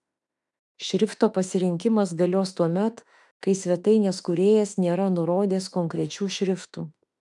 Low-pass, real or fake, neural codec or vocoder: 10.8 kHz; fake; autoencoder, 48 kHz, 32 numbers a frame, DAC-VAE, trained on Japanese speech